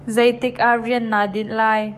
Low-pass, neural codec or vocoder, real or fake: 14.4 kHz; codec, 44.1 kHz, 7.8 kbps, DAC; fake